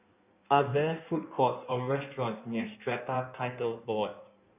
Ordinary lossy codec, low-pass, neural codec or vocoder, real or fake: none; 3.6 kHz; codec, 16 kHz in and 24 kHz out, 1.1 kbps, FireRedTTS-2 codec; fake